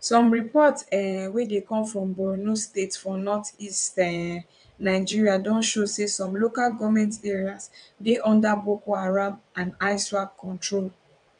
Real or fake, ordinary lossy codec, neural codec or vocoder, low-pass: fake; MP3, 96 kbps; vocoder, 22.05 kHz, 80 mel bands, WaveNeXt; 9.9 kHz